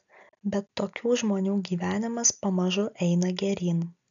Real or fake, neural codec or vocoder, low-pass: real; none; 7.2 kHz